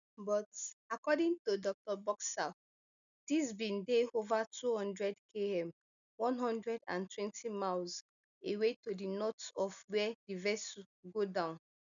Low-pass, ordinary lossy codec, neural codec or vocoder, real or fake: 7.2 kHz; none; none; real